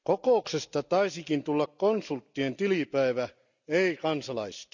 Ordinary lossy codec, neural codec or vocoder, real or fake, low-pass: none; none; real; 7.2 kHz